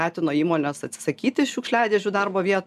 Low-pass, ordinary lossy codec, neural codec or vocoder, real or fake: 14.4 kHz; MP3, 96 kbps; none; real